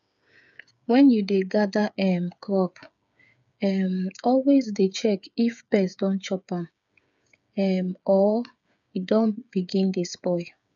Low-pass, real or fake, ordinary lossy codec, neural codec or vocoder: 7.2 kHz; fake; none; codec, 16 kHz, 8 kbps, FreqCodec, smaller model